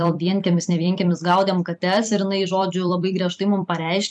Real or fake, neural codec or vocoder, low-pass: fake; vocoder, 44.1 kHz, 128 mel bands every 256 samples, BigVGAN v2; 10.8 kHz